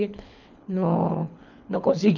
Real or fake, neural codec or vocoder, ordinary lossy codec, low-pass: fake; codec, 24 kHz, 6 kbps, HILCodec; none; 7.2 kHz